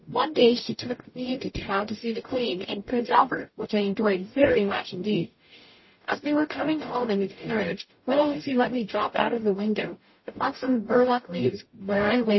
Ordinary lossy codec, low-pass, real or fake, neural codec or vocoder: MP3, 24 kbps; 7.2 kHz; fake; codec, 44.1 kHz, 0.9 kbps, DAC